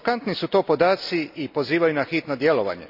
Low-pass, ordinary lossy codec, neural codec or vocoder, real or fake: 5.4 kHz; none; none; real